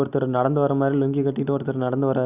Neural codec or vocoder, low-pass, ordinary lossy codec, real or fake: none; 3.6 kHz; none; real